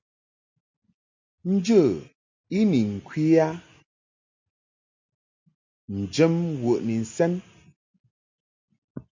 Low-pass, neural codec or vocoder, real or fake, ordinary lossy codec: 7.2 kHz; none; real; MP3, 48 kbps